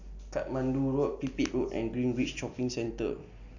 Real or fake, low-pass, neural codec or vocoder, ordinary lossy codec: real; 7.2 kHz; none; none